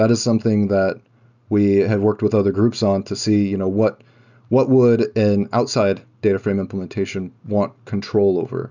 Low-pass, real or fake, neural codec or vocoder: 7.2 kHz; real; none